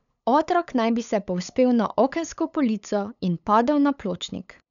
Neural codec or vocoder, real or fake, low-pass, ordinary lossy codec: codec, 16 kHz, 8 kbps, FunCodec, trained on LibriTTS, 25 frames a second; fake; 7.2 kHz; none